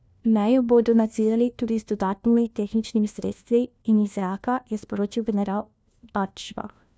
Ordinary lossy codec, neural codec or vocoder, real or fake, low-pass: none; codec, 16 kHz, 1 kbps, FunCodec, trained on LibriTTS, 50 frames a second; fake; none